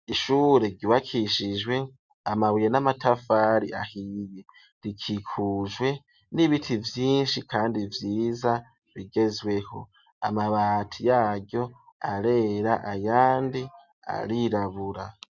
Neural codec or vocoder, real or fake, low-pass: none; real; 7.2 kHz